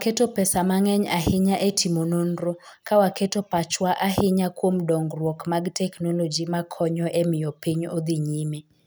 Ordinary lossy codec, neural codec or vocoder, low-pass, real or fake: none; none; none; real